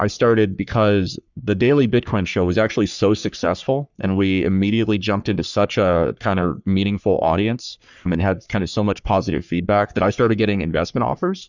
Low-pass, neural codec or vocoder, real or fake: 7.2 kHz; codec, 44.1 kHz, 3.4 kbps, Pupu-Codec; fake